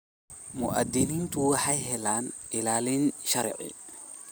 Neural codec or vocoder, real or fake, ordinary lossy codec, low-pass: none; real; none; none